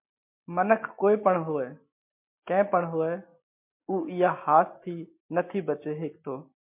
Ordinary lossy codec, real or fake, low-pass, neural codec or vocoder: MP3, 32 kbps; real; 3.6 kHz; none